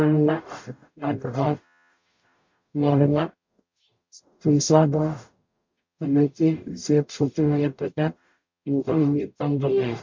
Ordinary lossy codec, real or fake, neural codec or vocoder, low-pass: MP3, 48 kbps; fake; codec, 44.1 kHz, 0.9 kbps, DAC; 7.2 kHz